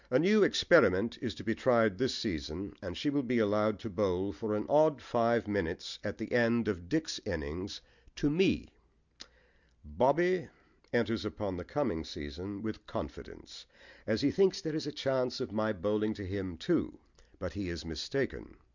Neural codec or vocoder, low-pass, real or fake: none; 7.2 kHz; real